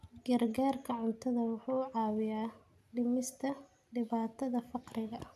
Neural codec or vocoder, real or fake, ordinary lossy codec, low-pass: none; real; none; 14.4 kHz